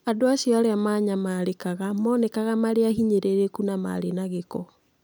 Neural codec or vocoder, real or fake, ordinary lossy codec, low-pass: none; real; none; none